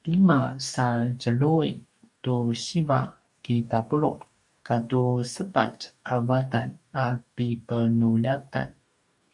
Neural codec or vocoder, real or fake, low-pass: codec, 44.1 kHz, 2.6 kbps, DAC; fake; 10.8 kHz